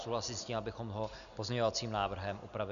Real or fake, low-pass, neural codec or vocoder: real; 7.2 kHz; none